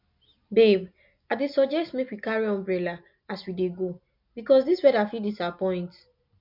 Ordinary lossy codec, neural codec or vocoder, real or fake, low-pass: MP3, 48 kbps; none; real; 5.4 kHz